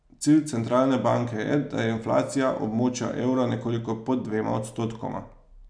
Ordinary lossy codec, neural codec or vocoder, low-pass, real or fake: none; none; 9.9 kHz; real